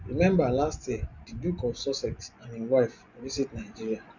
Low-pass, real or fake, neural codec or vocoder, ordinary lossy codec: 7.2 kHz; fake; vocoder, 24 kHz, 100 mel bands, Vocos; none